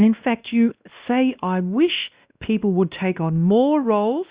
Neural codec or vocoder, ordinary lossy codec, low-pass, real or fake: codec, 16 kHz, 1 kbps, X-Codec, WavLM features, trained on Multilingual LibriSpeech; Opus, 64 kbps; 3.6 kHz; fake